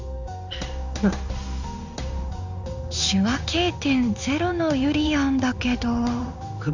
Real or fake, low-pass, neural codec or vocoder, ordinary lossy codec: fake; 7.2 kHz; codec, 16 kHz in and 24 kHz out, 1 kbps, XY-Tokenizer; none